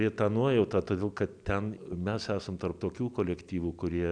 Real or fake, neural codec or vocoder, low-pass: real; none; 9.9 kHz